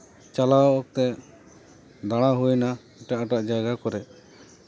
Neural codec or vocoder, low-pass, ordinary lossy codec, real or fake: none; none; none; real